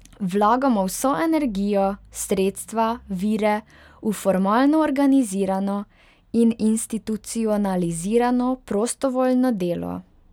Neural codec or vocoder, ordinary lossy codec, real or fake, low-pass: none; none; real; 19.8 kHz